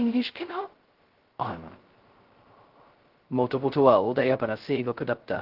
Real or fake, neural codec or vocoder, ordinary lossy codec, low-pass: fake; codec, 16 kHz, 0.2 kbps, FocalCodec; Opus, 16 kbps; 5.4 kHz